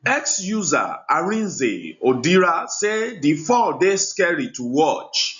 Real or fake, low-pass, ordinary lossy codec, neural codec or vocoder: real; 7.2 kHz; none; none